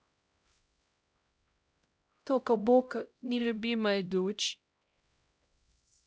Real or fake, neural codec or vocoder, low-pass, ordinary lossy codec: fake; codec, 16 kHz, 0.5 kbps, X-Codec, HuBERT features, trained on LibriSpeech; none; none